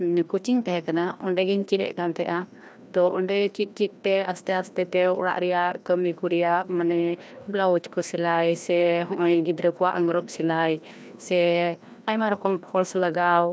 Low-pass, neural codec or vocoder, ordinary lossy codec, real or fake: none; codec, 16 kHz, 1 kbps, FreqCodec, larger model; none; fake